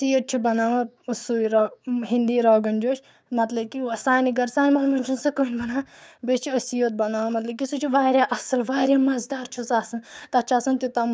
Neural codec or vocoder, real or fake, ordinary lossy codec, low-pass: codec, 16 kHz, 6 kbps, DAC; fake; none; none